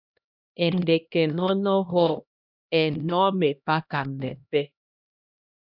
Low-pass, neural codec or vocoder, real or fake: 5.4 kHz; codec, 16 kHz, 1 kbps, X-Codec, HuBERT features, trained on LibriSpeech; fake